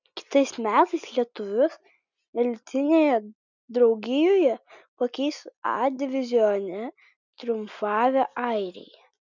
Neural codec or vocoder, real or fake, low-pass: none; real; 7.2 kHz